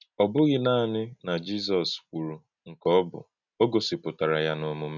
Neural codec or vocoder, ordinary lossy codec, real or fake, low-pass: none; none; real; 7.2 kHz